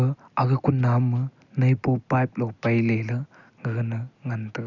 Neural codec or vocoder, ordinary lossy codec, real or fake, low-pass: none; none; real; 7.2 kHz